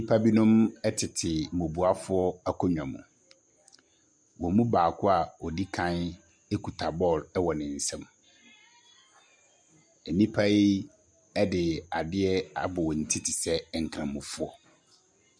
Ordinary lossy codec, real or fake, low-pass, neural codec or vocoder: MP3, 96 kbps; real; 9.9 kHz; none